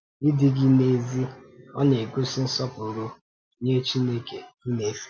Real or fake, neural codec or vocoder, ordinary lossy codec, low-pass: real; none; none; none